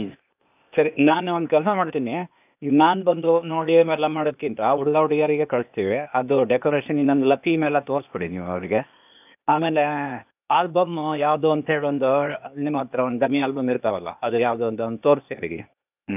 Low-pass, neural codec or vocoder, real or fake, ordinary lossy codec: 3.6 kHz; codec, 16 kHz, 0.8 kbps, ZipCodec; fake; none